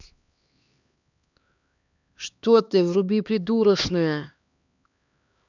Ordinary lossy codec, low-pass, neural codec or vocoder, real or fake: none; 7.2 kHz; codec, 16 kHz, 2 kbps, X-Codec, HuBERT features, trained on LibriSpeech; fake